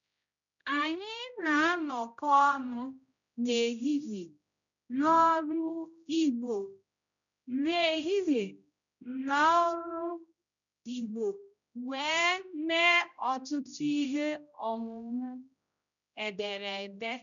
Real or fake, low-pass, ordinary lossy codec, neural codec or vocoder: fake; 7.2 kHz; none; codec, 16 kHz, 0.5 kbps, X-Codec, HuBERT features, trained on general audio